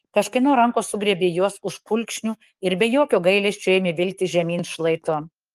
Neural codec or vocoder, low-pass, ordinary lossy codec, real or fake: codec, 44.1 kHz, 7.8 kbps, DAC; 14.4 kHz; Opus, 32 kbps; fake